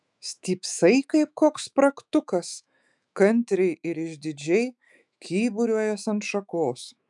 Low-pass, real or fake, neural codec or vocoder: 10.8 kHz; fake; autoencoder, 48 kHz, 128 numbers a frame, DAC-VAE, trained on Japanese speech